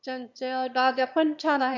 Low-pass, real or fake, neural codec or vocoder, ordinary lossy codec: 7.2 kHz; fake; autoencoder, 22.05 kHz, a latent of 192 numbers a frame, VITS, trained on one speaker; none